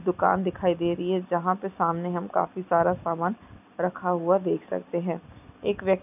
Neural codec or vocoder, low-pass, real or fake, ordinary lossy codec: none; 3.6 kHz; real; none